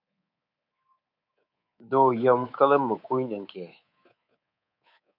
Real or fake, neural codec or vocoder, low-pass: fake; codec, 24 kHz, 3.1 kbps, DualCodec; 5.4 kHz